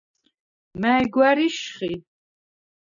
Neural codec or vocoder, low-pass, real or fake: none; 7.2 kHz; real